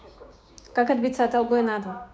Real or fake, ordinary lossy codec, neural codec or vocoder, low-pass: fake; none; codec, 16 kHz, 6 kbps, DAC; none